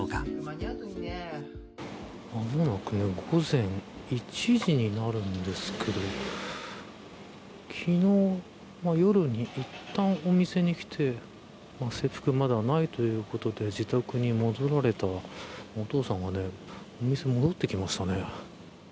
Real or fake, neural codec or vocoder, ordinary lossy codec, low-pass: real; none; none; none